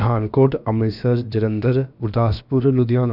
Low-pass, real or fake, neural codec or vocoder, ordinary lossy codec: 5.4 kHz; fake; codec, 16 kHz, about 1 kbps, DyCAST, with the encoder's durations; none